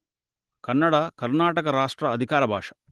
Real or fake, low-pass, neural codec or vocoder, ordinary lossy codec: real; 10.8 kHz; none; Opus, 16 kbps